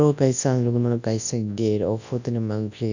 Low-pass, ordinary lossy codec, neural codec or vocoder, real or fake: 7.2 kHz; none; codec, 24 kHz, 0.9 kbps, WavTokenizer, large speech release; fake